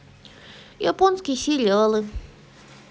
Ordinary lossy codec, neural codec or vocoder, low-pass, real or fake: none; none; none; real